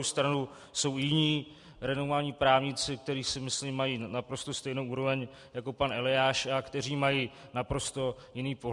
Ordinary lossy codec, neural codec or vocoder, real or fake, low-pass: MP3, 96 kbps; none; real; 10.8 kHz